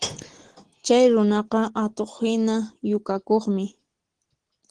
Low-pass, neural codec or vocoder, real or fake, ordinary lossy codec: 9.9 kHz; none; real; Opus, 16 kbps